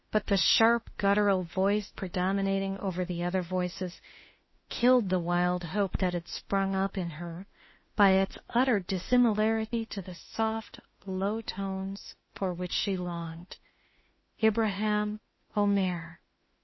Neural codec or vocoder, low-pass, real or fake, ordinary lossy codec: autoencoder, 48 kHz, 32 numbers a frame, DAC-VAE, trained on Japanese speech; 7.2 kHz; fake; MP3, 24 kbps